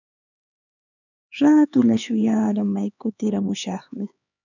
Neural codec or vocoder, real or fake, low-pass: codec, 16 kHz, 4 kbps, X-Codec, HuBERT features, trained on LibriSpeech; fake; 7.2 kHz